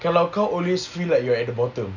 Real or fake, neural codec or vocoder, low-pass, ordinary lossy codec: real; none; 7.2 kHz; none